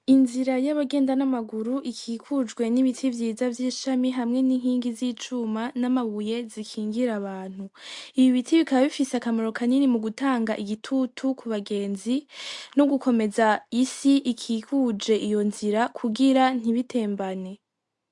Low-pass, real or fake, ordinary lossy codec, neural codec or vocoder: 10.8 kHz; real; MP3, 64 kbps; none